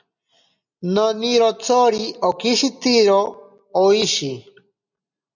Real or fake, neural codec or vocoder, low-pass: real; none; 7.2 kHz